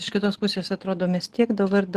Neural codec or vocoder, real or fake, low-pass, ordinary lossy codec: none; real; 14.4 kHz; Opus, 16 kbps